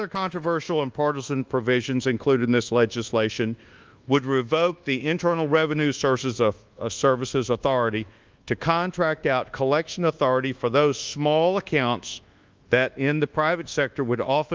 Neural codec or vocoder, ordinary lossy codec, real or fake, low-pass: codec, 24 kHz, 1.2 kbps, DualCodec; Opus, 24 kbps; fake; 7.2 kHz